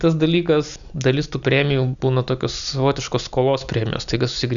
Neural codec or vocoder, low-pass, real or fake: none; 7.2 kHz; real